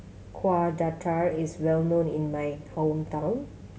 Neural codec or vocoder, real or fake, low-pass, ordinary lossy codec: none; real; none; none